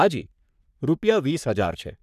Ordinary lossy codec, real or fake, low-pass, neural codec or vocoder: none; fake; 14.4 kHz; codec, 44.1 kHz, 3.4 kbps, Pupu-Codec